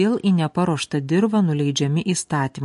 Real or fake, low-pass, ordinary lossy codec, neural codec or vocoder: real; 14.4 kHz; MP3, 48 kbps; none